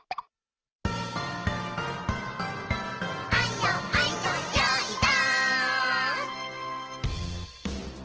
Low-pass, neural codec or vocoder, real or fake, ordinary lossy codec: 7.2 kHz; none; real; Opus, 16 kbps